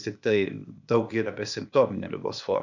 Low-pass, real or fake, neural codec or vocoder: 7.2 kHz; fake; codec, 16 kHz, 0.8 kbps, ZipCodec